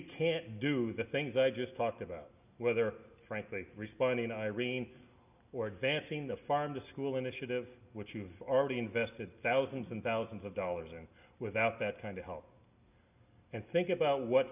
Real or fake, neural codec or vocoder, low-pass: real; none; 3.6 kHz